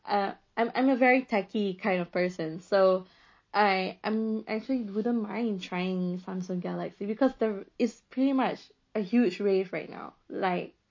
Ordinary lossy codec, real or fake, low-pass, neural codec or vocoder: MP3, 32 kbps; real; 7.2 kHz; none